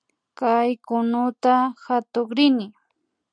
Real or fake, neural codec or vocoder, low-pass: real; none; 9.9 kHz